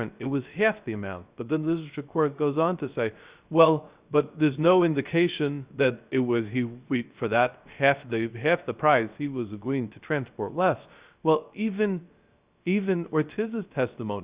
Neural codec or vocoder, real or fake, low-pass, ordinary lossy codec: codec, 16 kHz, 0.3 kbps, FocalCodec; fake; 3.6 kHz; Opus, 64 kbps